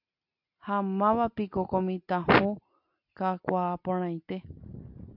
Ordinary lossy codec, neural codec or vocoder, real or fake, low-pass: MP3, 48 kbps; none; real; 5.4 kHz